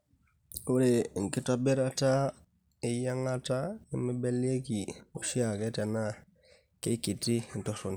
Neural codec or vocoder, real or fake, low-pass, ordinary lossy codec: none; real; none; none